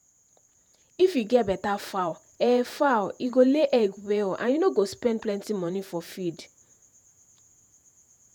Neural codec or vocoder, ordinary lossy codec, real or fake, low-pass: vocoder, 48 kHz, 128 mel bands, Vocos; none; fake; none